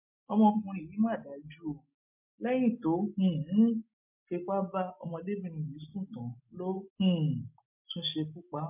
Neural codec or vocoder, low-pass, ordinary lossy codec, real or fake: none; 3.6 kHz; MP3, 32 kbps; real